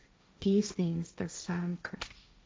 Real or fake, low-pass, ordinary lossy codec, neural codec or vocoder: fake; none; none; codec, 16 kHz, 1.1 kbps, Voila-Tokenizer